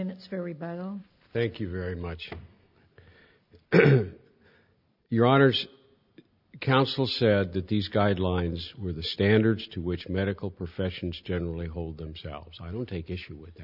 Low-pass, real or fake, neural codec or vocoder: 5.4 kHz; real; none